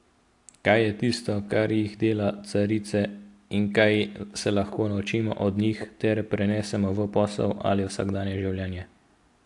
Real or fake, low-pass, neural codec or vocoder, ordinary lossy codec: real; 10.8 kHz; none; AAC, 64 kbps